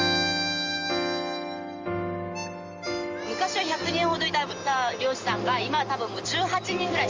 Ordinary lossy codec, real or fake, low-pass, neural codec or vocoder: Opus, 32 kbps; real; 7.2 kHz; none